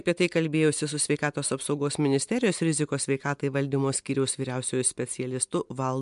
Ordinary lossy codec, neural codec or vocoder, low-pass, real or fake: MP3, 64 kbps; none; 10.8 kHz; real